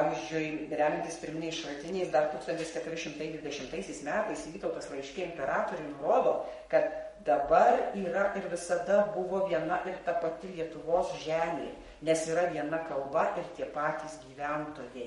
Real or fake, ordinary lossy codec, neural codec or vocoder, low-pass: fake; MP3, 48 kbps; codec, 44.1 kHz, 7.8 kbps, Pupu-Codec; 19.8 kHz